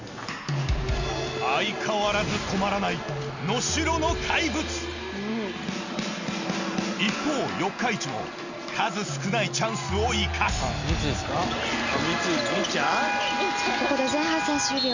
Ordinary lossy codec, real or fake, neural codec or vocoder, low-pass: Opus, 64 kbps; real; none; 7.2 kHz